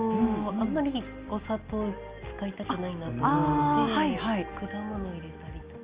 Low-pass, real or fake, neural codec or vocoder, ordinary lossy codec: 3.6 kHz; real; none; Opus, 24 kbps